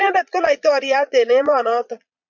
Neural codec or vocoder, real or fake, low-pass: vocoder, 22.05 kHz, 80 mel bands, Vocos; fake; 7.2 kHz